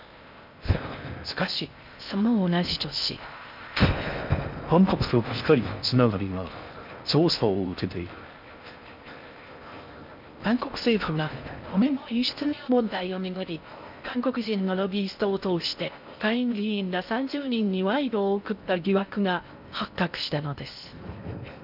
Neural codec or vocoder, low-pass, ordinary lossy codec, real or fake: codec, 16 kHz in and 24 kHz out, 0.6 kbps, FocalCodec, streaming, 4096 codes; 5.4 kHz; none; fake